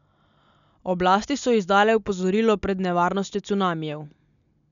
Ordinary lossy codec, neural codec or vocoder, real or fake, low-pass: MP3, 96 kbps; none; real; 7.2 kHz